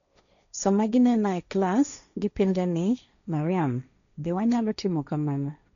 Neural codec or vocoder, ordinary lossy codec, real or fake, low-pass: codec, 16 kHz, 1.1 kbps, Voila-Tokenizer; none; fake; 7.2 kHz